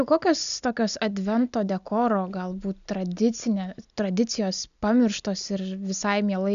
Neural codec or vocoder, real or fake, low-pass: none; real; 7.2 kHz